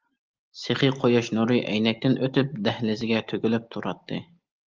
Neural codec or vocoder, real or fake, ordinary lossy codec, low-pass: none; real; Opus, 32 kbps; 7.2 kHz